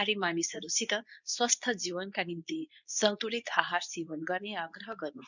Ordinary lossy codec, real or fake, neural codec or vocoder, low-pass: none; fake; codec, 24 kHz, 0.9 kbps, WavTokenizer, medium speech release version 2; 7.2 kHz